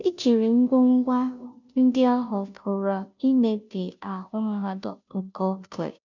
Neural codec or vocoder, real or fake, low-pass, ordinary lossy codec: codec, 16 kHz, 0.5 kbps, FunCodec, trained on Chinese and English, 25 frames a second; fake; 7.2 kHz; none